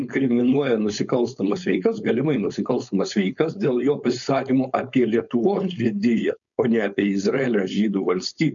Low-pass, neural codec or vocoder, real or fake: 7.2 kHz; codec, 16 kHz, 4.8 kbps, FACodec; fake